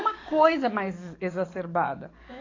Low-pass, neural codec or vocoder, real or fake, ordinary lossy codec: 7.2 kHz; codec, 44.1 kHz, 7.8 kbps, DAC; fake; AAC, 32 kbps